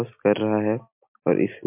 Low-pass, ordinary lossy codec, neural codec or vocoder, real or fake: 3.6 kHz; MP3, 32 kbps; none; real